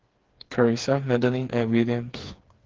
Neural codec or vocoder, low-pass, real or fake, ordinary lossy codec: codec, 16 kHz, 4 kbps, FreqCodec, smaller model; 7.2 kHz; fake; Opus, 16 kbps